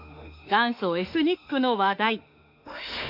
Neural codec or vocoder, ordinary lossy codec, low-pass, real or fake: autoencoder, 48 kHz, 32 numbers a frame, DAC-VAE, trained on Japanese speech; none; 5.4 kHz; fake